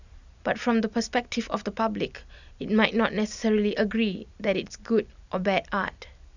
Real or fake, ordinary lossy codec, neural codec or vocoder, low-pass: real; none; none; 7.2 kHz